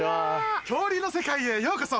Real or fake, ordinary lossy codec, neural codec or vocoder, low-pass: real; none; none; none